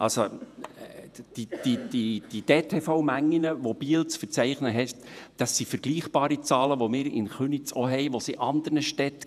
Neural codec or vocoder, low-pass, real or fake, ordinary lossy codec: vocoder, 48 kHz, 128 mel bands, Vocos; 14.4 kHz; fake; none